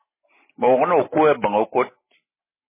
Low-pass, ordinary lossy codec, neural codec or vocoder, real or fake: 3.6 kHz; MP3, 16 kbps; none; real